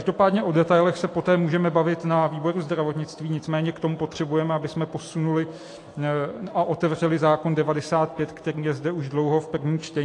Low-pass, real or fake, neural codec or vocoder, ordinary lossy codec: 10.8 kHz; real; none; AAC, 48 kbps